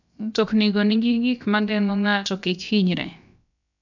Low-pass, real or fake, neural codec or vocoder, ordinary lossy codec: 7.2 kHz; fake; codec, 16 kHz, about 1 kbps, DyCAST, with the encoder's durations; none